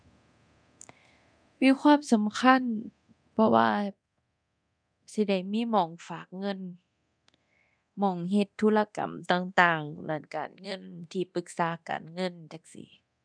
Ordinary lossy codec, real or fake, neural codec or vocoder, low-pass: none; fake; codec, 24 kHz, 0.9 kbps, DualCodec; 9.9 kHz